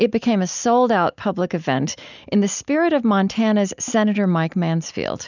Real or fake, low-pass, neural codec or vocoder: real; 7.2 kHz; none